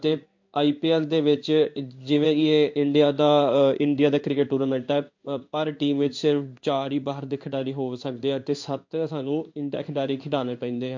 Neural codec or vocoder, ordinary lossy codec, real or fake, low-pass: codec, 16 kHz in and 24 kHz out, 1 kbps, XY-Tokenizer; MP3, 48 kbps; fake; 7.2 kHz